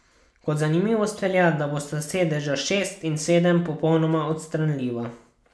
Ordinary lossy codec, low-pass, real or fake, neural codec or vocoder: none; none; real; none